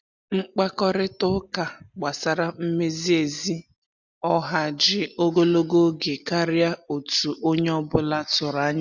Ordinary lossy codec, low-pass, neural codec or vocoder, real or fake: none; 7.2 kHz; none; real